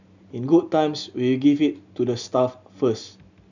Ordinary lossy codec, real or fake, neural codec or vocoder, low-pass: none; real; none; 7.2 kHz